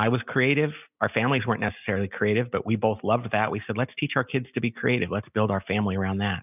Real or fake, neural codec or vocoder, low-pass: real; none; 3.6 kHz